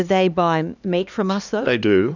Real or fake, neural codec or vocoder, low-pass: fake; codec, 16 kHz, 2 kbps, X-Codec, HuBERT features, trained on LibriSpeech; 7.2 kHz